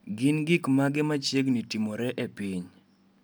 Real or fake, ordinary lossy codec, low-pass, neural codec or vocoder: real; none; none; none